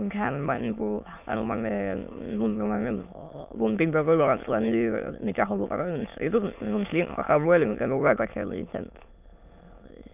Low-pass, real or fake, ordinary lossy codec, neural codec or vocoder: 3.6 kHz; fake; none; autoencoder, 22.05 kHz, a latent of 192 numbers a frame, VITS, trained on many speakers